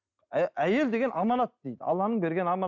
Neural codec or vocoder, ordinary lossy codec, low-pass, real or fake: none; none; 7.2 kHz; real